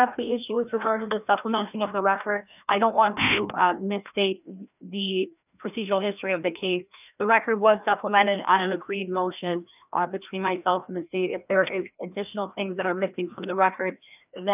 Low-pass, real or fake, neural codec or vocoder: 3.6 kHz; fake; codec, 16 kHz, 1 kbps, FreqCodec, larger model